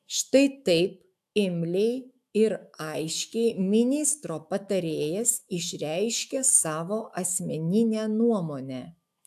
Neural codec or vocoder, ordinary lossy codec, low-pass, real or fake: autoencoder, 48 kHz, 128 numbers a frame, DAC-VAE, trained on Japanese speech; AAC, 96 kbps; 14.4 kHz; fake